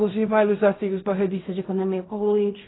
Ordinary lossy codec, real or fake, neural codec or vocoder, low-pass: AAC, 16 kbps; fake; codec, 16 kHz in and 24 kHz out, 0.4 kbps, LongCat-Audio-Codec, fine tuned four codebook decoder; 7.2 kHz